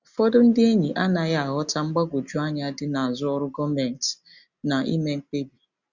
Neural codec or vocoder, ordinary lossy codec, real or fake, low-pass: none; Opus, 64 kbps; real; 7.2 kHz